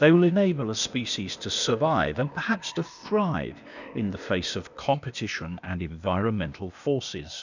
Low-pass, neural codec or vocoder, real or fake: 7.2 kHz; codec, 16 kHz, 0.8 kbps, ZipCodec; fake